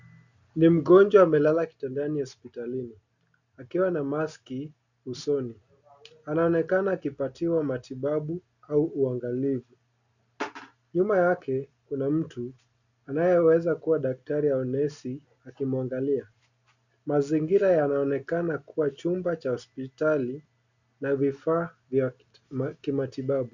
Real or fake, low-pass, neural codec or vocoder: real; 7.2 kHz; none